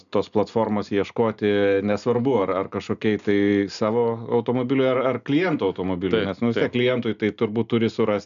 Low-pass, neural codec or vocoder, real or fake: 7.2 kHz; none; real